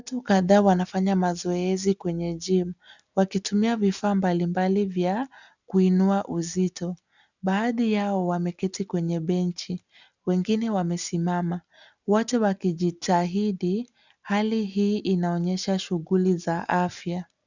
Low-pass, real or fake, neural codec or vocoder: 7.2 kHz; real; none